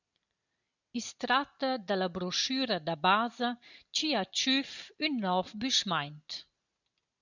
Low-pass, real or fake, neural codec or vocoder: 7.2 kHz; real; none